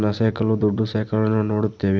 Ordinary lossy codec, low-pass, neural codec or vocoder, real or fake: none; none; none; real